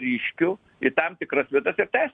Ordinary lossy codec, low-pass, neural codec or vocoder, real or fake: MP3, 96 kbps; 9.9 kHz; none; real